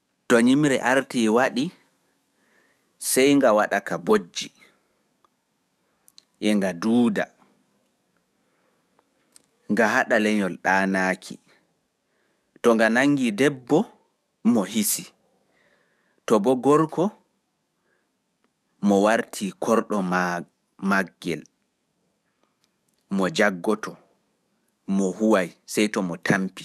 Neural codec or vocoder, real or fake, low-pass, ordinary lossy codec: codec, 44.1 kHz, 7.8 kbps, DAC; fake; 14.4 kHz; none